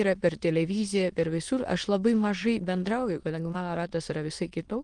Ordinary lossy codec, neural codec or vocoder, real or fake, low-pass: Opus, 24 kbps; autoencoder, 22.05 kHz, a latent of 192 numbers a frame, VITS, trained on many speakers; fake; 9.9 kHz